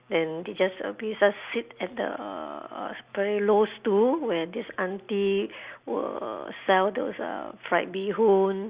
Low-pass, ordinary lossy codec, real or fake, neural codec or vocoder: 3.6 kHz; Opus, 64 kbps; real; none